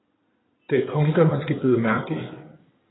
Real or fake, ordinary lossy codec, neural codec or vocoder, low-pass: fake; AAC, 16 kbps; codec, 16 kHz in and 24 kHz out, 2.2 kbps, FireRedTTS-2 codec; 7.2 kHz